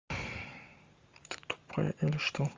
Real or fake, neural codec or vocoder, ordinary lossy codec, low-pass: fake; vocoder, 44.1 kHz, 128 mel bands every 512 samples, BigVGAN v2; Opus, 32 kbps; 7.2 kHz